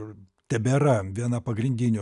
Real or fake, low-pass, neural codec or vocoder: real; 10.8 kHz; none